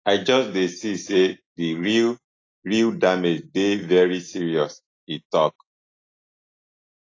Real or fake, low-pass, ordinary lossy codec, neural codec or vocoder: real; 7.2 kHz; AAC, 32 kbps; none